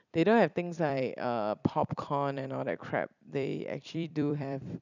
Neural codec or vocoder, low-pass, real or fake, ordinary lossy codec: vocoder, 44.1 kHz, 128 mel bands every 256 samples, BigVGAN v2; 7.2 kHz; fake; none